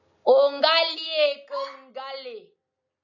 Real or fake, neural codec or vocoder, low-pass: real; none; 7.2 kHz